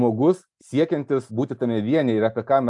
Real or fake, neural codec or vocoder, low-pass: real; none; 10.8 kHz